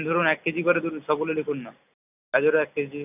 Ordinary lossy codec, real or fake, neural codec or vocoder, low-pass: none; real; none; 3.6 kHz